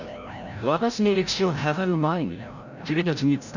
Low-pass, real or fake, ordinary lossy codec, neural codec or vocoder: 7.2 kHz; fake; none; codec, 16 kHz, 0.5 kbps, FreqCodec, larger model